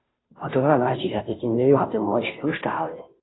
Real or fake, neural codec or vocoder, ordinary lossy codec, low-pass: fake; codec, 16 kHz, 0.5 kbps, FunCodec, trained on Chinese and English, 25 frames a second; AAC, 16 kbps; 7.2 kHz